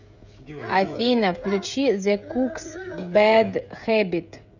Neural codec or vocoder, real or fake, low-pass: codec, 16 kHz, 16 kbps, FreqCodec, smaller model; fake; 7.2 kHz